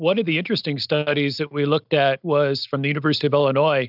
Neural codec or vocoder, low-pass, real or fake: none; 5.4 kHz; real